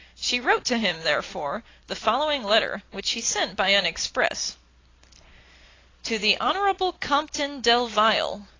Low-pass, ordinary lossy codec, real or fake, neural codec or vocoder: 7.2 kHz; AAC, 32 kbps; real; none